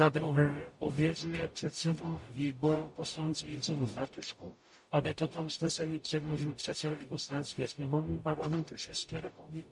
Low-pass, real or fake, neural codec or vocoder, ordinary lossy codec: 10.8 kHz; fake; codec, 44.1 kHz, 0.9 kbps, DAC; MP3, 48 kbps